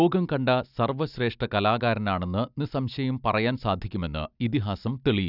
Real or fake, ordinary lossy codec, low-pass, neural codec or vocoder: real; none; 5.4 kHz; none